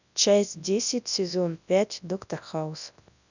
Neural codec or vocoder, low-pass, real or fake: codec, 24 kHz, 0.9 kbps, WavTokenizer, large speech release; 7.2 kHz; fake